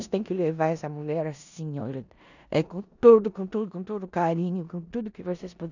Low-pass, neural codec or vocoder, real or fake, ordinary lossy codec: 7.2 kHz; codec, 16 kHz in and 24 kHz out, 0.9 kbps, LongCat-Audio-Codec, four codebook decoder; fake; none